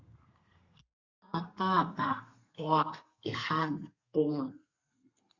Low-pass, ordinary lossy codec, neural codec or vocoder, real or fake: 7.2 kHz; Opus, 64 kbps; codec, 32 kHz, 1.9 kbps, SNAC; fake